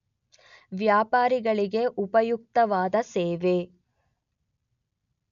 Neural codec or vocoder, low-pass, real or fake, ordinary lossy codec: none; 7.2 kHz; real; none